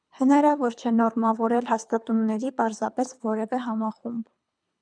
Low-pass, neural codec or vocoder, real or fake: 9.9 kHz; codec, 24 kHz, 3 kbps, HILCodec; fake